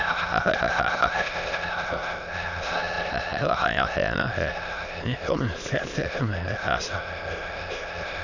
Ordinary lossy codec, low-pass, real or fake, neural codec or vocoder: none; 7.2 kHz; fake; autoencoder, 22.05 kHz, a latent of 192 numbers a frame, VITS, trained on many speakers